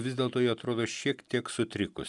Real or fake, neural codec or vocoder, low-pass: real; none; 10.8 kHz